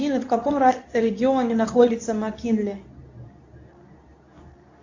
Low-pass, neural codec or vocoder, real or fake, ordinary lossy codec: 7.2 kHz; codec, 24 kHz, 0.9 kbps, WavTokenizer, medium speech release version 2; fake; AAC, 48 kbps